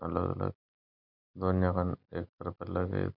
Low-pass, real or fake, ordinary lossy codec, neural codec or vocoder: 5.4 kHz; real; none; none